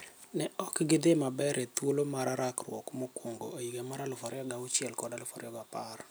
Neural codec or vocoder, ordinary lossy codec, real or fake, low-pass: none; none; real; none